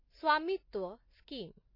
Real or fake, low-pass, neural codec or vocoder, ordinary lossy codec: real; 5.4 kHz; none; MP3, 24 kbps